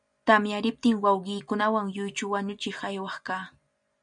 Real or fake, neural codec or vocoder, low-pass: real; none; 9.9 kHz